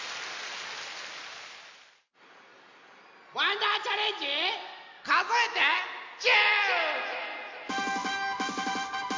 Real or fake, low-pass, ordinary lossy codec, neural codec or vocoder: real; 7.2 kHz; MP3, 48 kbps; none